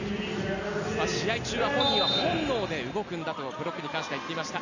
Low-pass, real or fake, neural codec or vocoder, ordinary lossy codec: 7.2 kHz; real; none; none